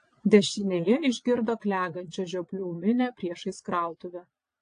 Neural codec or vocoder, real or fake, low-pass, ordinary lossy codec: vocoder, 22.05 kHz, 80 mel bands, Vocos; fake; 9.9 kHz; AAC, 48 kbps